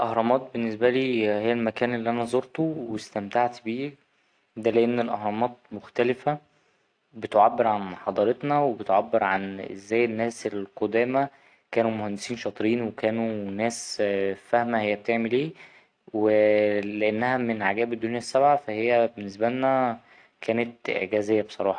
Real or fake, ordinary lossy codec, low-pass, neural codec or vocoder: real; AAC, 48 kbps; 9.9 kHz; none